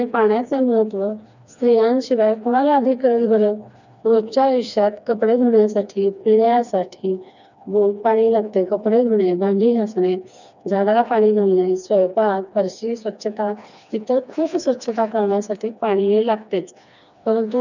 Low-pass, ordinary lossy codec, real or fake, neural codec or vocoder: 7.2 kHz; none; fake; codec, 16 kHz, 2 kbps, FreqCodec, smaller model